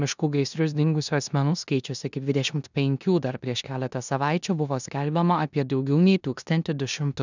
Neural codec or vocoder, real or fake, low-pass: codec, 16 kHz in and 24 kHz out, 0.9 kbps, LongCat-Audio-Codec, four codebook decoder; fake; 7.2 kHz